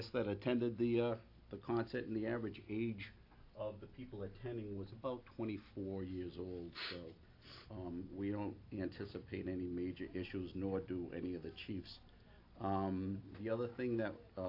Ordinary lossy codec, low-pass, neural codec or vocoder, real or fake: AAC, 32 kbps; 5.4 kHz; none; real